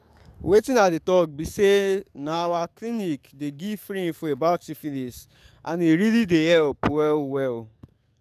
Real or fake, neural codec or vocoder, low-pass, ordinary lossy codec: fake; codec, 44.1 kHz, 7.8 kbps, DAC; 14.4 kHz; none